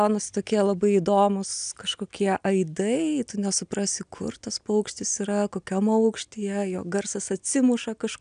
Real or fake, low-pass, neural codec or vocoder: real; 9.9 kHz; none